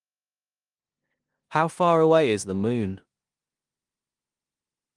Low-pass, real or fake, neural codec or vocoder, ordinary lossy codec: 10.8 kHz; fake; codec, 16 kHz in and 24 kHz out, 0.9 kbps, LongCat-Audio-Codec, four codebook decoder; Opus, 24 kbps